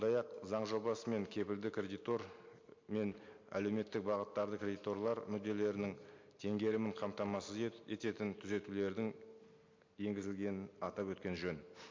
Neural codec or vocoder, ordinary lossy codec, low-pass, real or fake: none; MP3, 48 kbps; 7.2 kHz; real